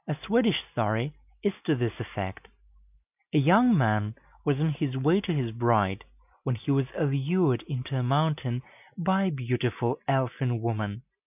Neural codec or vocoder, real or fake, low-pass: none; real; 3.6 kHz